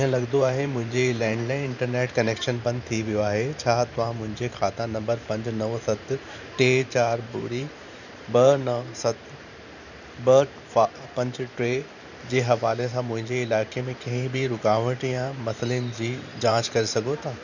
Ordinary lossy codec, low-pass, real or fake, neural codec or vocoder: none; 7.2 kHz; fake; vocoder, 44.1 kHz, 128 mel bands every 512 samples, BigVGAN v2